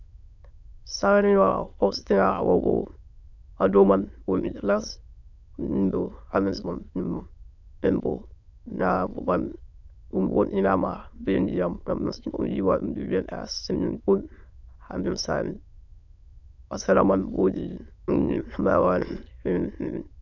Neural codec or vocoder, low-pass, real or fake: autoencoder, 22.05 kHz, a latent of 192 numbers a frame, VITS, trained on many speakers; 7.2 kHz; fake